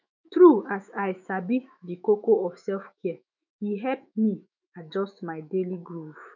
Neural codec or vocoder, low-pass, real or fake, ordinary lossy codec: autoencoder, 48 kHz, 128 numbers a frame, DAC-VAE, trained on Japanese speech; 7.2 kHz; fake; none